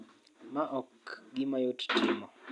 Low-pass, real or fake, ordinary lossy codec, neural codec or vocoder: 10.8 kHz; real; none; none